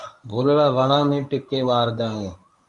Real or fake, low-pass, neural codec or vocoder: fake; 10.8 kHz; codec, 24 kHz, 0.9 kbps, WavTokenizer, medium speech release version 1